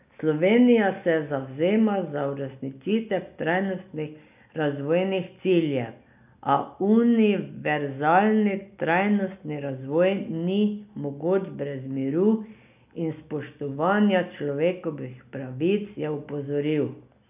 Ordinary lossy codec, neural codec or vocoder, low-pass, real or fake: none; none; 3.6 kHz; real